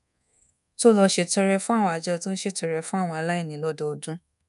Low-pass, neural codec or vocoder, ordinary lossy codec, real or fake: 10.8 kHz; codec, 24 kHz, 1.2 kbps, DualCodec; none; fake